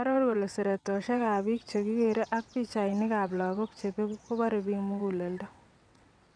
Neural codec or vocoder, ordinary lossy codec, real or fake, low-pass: vocoder, 22.05 kHz, 80 mel bands, WaveNeXt; none; fake; 9.9 kHz